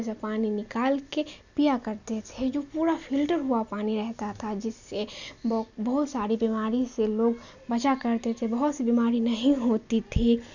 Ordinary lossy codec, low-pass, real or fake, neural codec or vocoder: none; 7.2 kHz; real; none